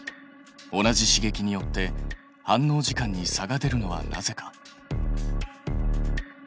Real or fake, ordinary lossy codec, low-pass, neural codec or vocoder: real; none; none; none